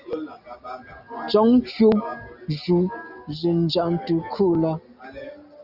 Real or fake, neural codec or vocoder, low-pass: real; none; 5.4 kHz